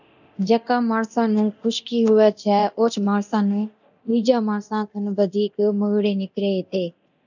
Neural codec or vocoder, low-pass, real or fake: codec, 24 kHz, 0.9 kbps, DualCodec; 7.2 kHz; fake